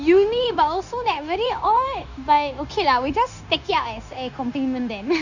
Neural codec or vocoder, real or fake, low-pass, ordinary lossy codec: codec, 16 kHz, 0.9 kbps, LongCat-Audio-Codec; fake; 7.2 kHz; none